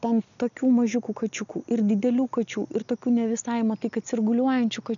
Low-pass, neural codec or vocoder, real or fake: 7.2 kHz; none; real